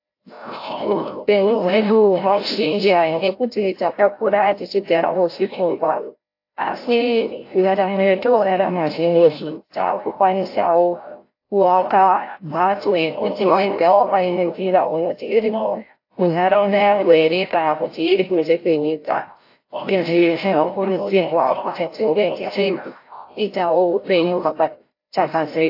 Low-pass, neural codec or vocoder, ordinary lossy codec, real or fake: 5.4 kHz; codec, 16 kHz, 0.5 kbps, FreqCodec, larger model; AAC, 32 kbps; fake